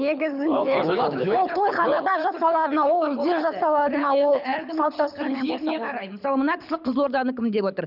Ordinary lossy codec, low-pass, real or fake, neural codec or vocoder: none; 5.4 kHz; fake; codec, 24 kHz, 6 kbps, HILCodec